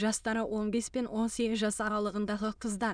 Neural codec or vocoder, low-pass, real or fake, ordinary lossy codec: autoencoder, 22.05 kHz, a latent of 192 numbers a frame, VITS, trained on many speakers; 9.9 kHz; fake; none